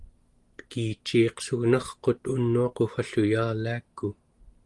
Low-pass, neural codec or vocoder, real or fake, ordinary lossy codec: 10.8 kHz; none; real; Opus, 24 kbps